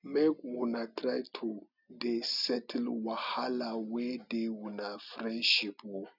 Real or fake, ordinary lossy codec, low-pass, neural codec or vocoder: real; none; 5.4 kHz; none